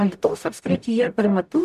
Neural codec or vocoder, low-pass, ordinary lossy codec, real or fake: codec, 44.1 kHz, 0.9 kbps, DAC; 14.4 kHz; MP3, 96 kbps; fake